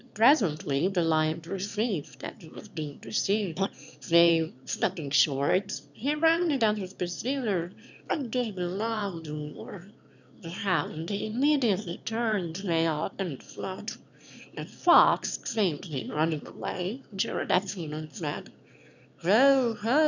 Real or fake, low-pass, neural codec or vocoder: fake; 7.2 kHz; autoencoder, 22.05 kHz, a latent of 192 numbers a frame, VITS, trained on one speaker